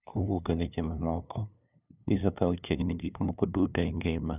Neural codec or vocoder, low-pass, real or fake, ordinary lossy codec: codec, 16 kHz, 2 kbps, FunCodec, trained on LibriTTS, 25 frames a second; 3.6 kHz; fake; none